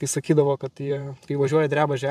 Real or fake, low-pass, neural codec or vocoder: fake; 14.4 kHz; vocoder, 44.1 kHz, 128 mel bands every 512 samples, BigVGAN v2